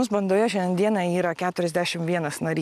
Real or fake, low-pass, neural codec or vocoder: real; 14.4 kHz; none